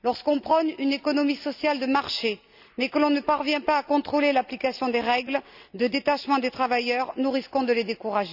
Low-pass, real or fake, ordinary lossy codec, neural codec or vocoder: 5.4 kHz; real; none; none